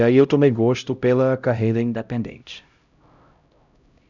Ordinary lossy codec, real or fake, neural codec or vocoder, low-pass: none; fake; codec, 16 kHz, 0.5 kbps, X-Codec, HuBERT features, trained on LibriSpeech; 7.2 kHz